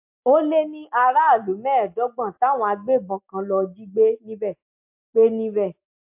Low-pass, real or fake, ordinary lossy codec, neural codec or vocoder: 3.6 kHz; real; MP3, 32 kbps; none